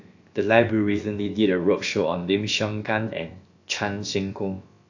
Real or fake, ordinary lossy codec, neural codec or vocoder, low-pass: fake; AAC, 48 kbps; codec, 16 kHz, about 1 kbps, DyCAST, with the encoder's durations; 7.2 kHz